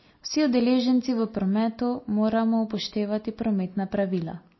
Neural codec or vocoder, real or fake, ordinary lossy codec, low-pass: none; real; MP3, 24 kbps; 7.2 kHz